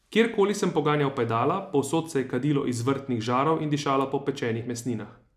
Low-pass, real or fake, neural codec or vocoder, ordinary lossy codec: 14.4 kHz; real; none; none